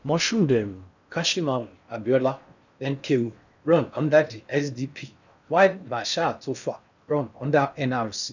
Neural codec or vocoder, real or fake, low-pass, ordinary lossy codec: codec, 16 kHz in and 24 kHz out, 0.6 kbps, FocalCodec, streaming, 4096 codes; fake; 7.2 kHz; none